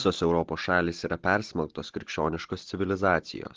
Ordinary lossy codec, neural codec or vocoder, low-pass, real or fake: Opus, 16 kbps; none; 7.2 kHz; real